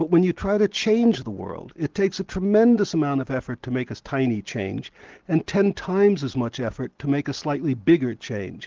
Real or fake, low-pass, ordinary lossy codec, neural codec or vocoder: real; 7.2 kHz; Opus, 16 kbps; none